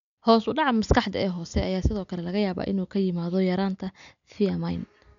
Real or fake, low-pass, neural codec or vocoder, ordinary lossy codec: real; 7.2 kHz; none; none